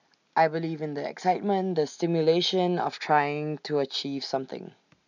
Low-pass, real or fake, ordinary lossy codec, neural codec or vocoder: 7.2 kHz; real; none; none